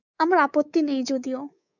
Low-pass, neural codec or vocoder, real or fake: 7.2 kHz; codec, 24 kHz, 3.1 kbps, DualCodec; fake